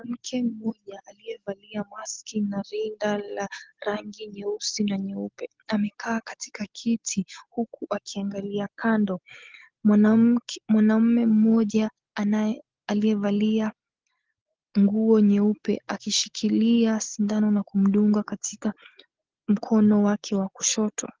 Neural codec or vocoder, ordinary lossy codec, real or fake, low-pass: none; Opus, 16 kbps; real; 7.2 kHz